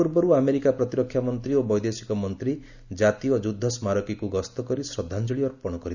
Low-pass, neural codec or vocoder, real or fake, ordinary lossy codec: 7.2 kHz; none; real; none